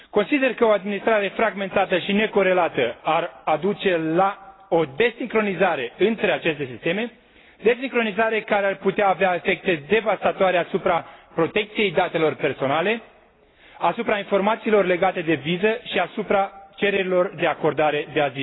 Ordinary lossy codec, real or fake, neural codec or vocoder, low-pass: AAC, 16 kbps; real; none; 7.2 kHz